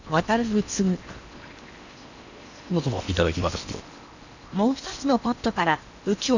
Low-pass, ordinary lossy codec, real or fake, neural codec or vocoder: 7.2 kHz; none; fake; codec, 16 kHz in and 24 kHz out, 0.8 kbps, FocalCodec, streaming, 65536 codes